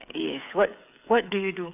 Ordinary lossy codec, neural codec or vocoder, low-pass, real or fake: none; codec, 16 kHz, 8 kbps, FreqCodec, smaller model; 3.6 kHz; fake